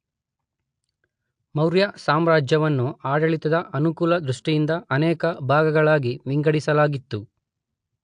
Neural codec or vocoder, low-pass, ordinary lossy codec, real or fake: none; 9.9 kHz; AAC, 64 kbps; real